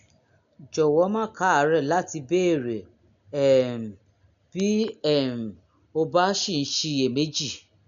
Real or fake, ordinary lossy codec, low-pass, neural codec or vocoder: real; none; 7.2 kHz; none